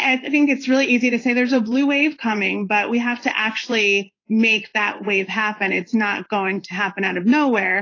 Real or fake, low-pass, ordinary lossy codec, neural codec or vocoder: fake; 7.2 kHz; AAC, 32 kbps; codec, 16 kHz in and 24 kHz out, 1 kbps, XY-Tokenizer